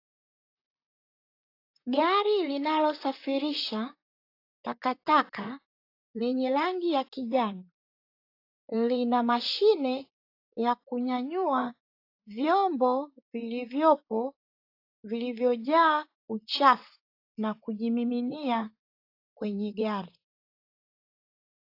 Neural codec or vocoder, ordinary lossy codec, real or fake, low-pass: vocoder, 44.1 kHz, 128 mel bands, Pupu-Vocoder; AAC, 32 kbps; fake; 5.4 kHz